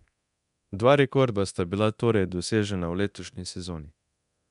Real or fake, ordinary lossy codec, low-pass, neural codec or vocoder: fake; none; 10.8 kHz; codec, 24 kHz, 0.9 kbps, DualCodec